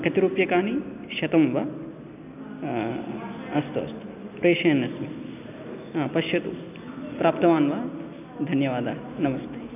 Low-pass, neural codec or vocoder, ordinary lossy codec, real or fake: 3.6 kHz; none; none; real